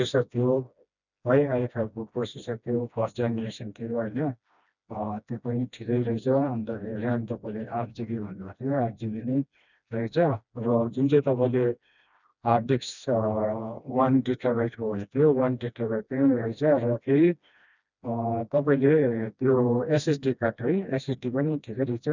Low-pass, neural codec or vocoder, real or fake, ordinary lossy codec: 7.2 kHz; codec, 16 kHz, 1 kbps, FreqCodec, smaller model; fake; none